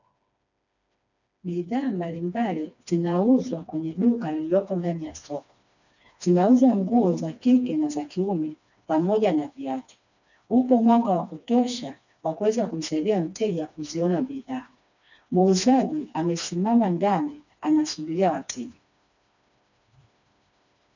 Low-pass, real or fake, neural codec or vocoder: 7.2 kHz; fake; codec, 16 kHz, 2 kbps, FreqCodec, smaller model